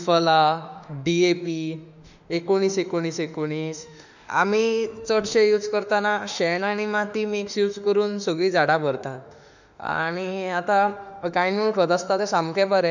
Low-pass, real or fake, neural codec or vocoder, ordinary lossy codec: 7.2 kHz; fake; autoencoder, 48 kHz, 32 numbers a frame, DAC-VAE, trained on Japanese speech; none